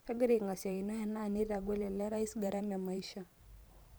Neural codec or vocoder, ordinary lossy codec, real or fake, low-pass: vocoder, 44.1 kHz, 128 mel bands every 512 samples, BigVGAN v2; none; fake; none